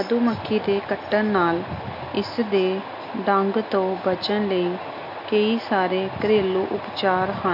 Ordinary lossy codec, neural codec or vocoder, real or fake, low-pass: MP3, 48 kbps; none; real; 5.4 kHz